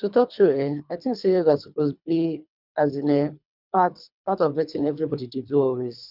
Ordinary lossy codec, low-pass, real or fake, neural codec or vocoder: none; 5.4 kHz; fake; codec, 24 kHz, 3 kbps, HILCodec